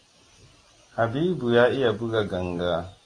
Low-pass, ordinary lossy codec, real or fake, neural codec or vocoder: 9.9 kHz; AAC, 32 kbps; real; none